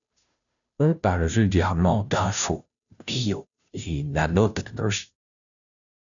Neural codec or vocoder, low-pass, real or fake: codec, 16 kHz, 0.5 kbps, FunCodec, trained on Chinese and English, 25 frames a second; 7.2 kHz; fake